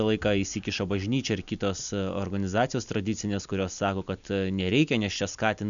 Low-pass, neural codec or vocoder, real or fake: 7.2 kHz; none; real